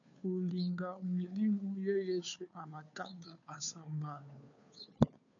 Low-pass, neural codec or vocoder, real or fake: 7.2 kHz; codec, 16 kHz, 2 kbps, FunCodec, trained on Chinese and English, 25 frames a second; fake